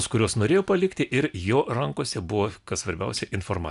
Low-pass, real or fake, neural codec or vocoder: 10.8 kHz; real; none